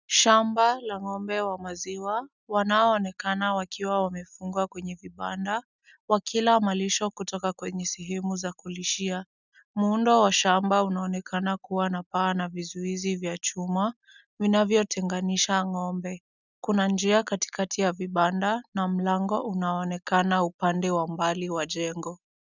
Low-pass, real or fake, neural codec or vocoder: 7.2 kHz; real; none